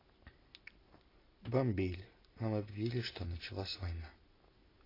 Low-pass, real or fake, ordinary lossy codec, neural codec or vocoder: 5.4 kHz; real; AAC, 24 kbps; none